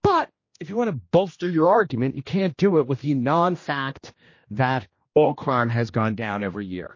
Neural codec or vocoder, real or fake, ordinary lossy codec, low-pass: codec, 16 kHz, 1 kbps, X-Codec, HuBERT features, trained on general audio; fake; MP3, 32 kbps; 7.2 kHz